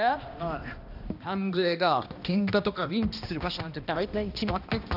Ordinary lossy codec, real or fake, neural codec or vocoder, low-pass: none; fake; codec, 16 kHz, 1 kbps, X-Codec, HuBERT features, trained on balanced general audio; 5.4 kHz